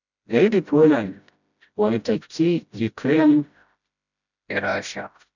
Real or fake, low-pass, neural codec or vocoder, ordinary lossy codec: fake; 7.2 kHz; codec, 16 kHz, 0.5 kbps, FreqCodec, smaller model; none